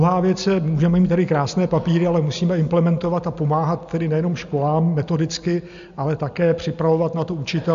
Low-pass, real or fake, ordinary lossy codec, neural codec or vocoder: 7.2 kHz; real; MP3, 64 kbps; none